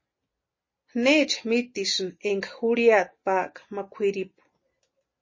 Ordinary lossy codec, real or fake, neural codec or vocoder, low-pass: MP3, 32 kbps; real; none; 7.2 kHz